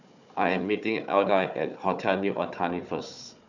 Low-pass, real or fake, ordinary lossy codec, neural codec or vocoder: 7.2 kHz; fake; none; codec, 16 kHz, 4 kbps, FunCodec, trained on Chinese and English, 50 frames a second